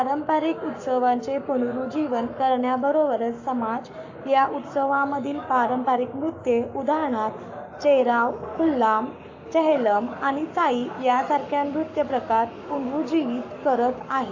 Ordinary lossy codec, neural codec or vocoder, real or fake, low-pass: AAC, 48 kbps; codec, 44.1 kHz, 7.8 kbps, Pupu-Codec; fake; 7.2 kHz